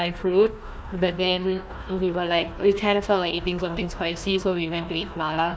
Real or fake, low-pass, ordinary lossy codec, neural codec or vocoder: fake; none; none; codec, 16 kHz, 1 kbps, FunCodec, trained on Chinese and English, 50 frames a second